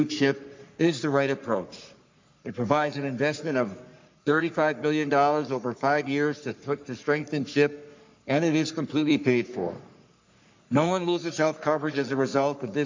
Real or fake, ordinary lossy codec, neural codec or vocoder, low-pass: fake; MP3, 64 kbps; codec, 44.1 kHz, 3.4 kbps, Pupu-Codec; 7.2 kHz